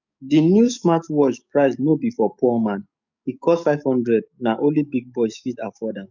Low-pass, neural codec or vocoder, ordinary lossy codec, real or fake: 7.2 kHz; codec, 44.1 kHz, 7.8 kbps, DAC; none; fake